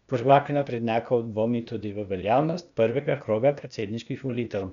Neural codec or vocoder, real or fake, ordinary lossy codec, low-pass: codec, 16 kHz, 0.8 kbps, ZipCodec; fake; none; 7.2 kHz